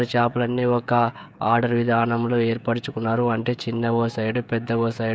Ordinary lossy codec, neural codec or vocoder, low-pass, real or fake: none; codec, 16 kHz, 16 kbps, FreqCodec, smaller model; none; fake